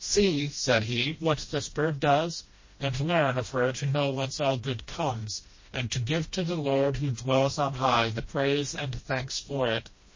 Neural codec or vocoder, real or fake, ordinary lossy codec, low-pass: codec, 16 kHz, 1 kbps, FreqCodec, smaller model; fake; MP3, 32 kbps; 7.2 kHz